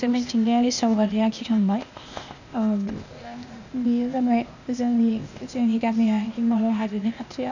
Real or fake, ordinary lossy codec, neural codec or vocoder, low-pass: fake; none; codec, 16 kHz, 0.8 kbps, ZipCodec; 7.2 kHz